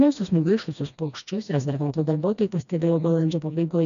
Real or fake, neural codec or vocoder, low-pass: fake; codec, 16 kHz, 1 kbps, FreqCodec, smaller model; 7.2 kHz